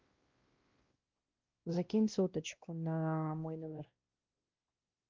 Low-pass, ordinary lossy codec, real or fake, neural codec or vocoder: 7.2 kHz; Opus, 32 kbps; fake; codec, 16 kHz, 1 kbps, X-Codec, WavLM features, trained on Multilingual LibriSpeech